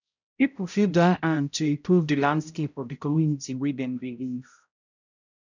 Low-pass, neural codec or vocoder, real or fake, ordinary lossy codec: 7.2 kHz; codec, 16 kHz, 0.5 kbps, X-Codec, HuBERT features, trained on balanced general audio; fake; none